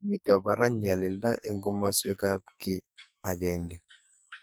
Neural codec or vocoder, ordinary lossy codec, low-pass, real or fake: codec, 44.1 kHz, 2.6 kbps, SNAC; none; none; fake